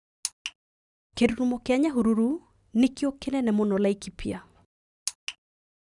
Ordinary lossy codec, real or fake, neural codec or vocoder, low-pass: none; real; none; 10.8 kHz